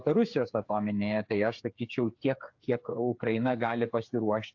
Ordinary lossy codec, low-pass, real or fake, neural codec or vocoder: AAC, 48 kbps; 7.2 kHz; fake; codec, 16 kHz, 8 kbps, FreqCodec, smaller model